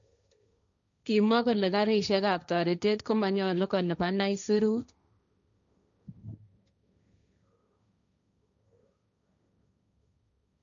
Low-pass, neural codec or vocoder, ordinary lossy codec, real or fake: 7.2 kHz; codec, 16 kHz, 1.1 kbps, Voila-Tokenizer; none; fake